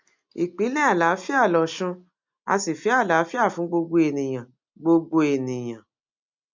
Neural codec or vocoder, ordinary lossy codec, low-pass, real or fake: none; MP3, 64 kbps; 7.2 kHz; real